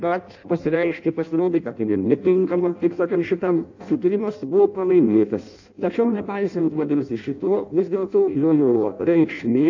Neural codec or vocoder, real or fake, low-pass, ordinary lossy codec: codec, 16 kHz in and 24 kHz out, 0.6 kbps, FireRedTTS-2 codec; fake; 7.2 kHz; AAC, 48 kbps